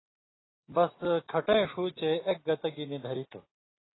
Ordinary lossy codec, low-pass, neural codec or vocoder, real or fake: AAC, 16 kbps; 7.2 kHz; none; real